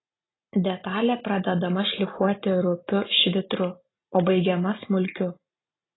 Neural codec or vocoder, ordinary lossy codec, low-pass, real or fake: none; AAC, 16 kbps; 7.2 kHz; real